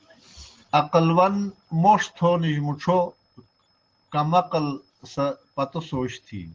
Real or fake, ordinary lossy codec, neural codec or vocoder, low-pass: real; Opus, 16 kbps; none; 7.2 kHz